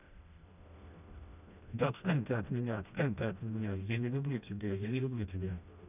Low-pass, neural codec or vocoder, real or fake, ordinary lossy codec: 3.6 kHz; codec, 16 kHz, 1 kbps, FreqCodec, smaller model; fake; none